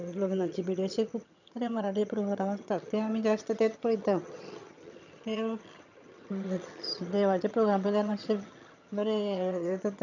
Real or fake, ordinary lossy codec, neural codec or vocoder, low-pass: fake; none; vocoder, 22.05 kHz, 80 mel bands, HiFi-GAN; 7.2 kHz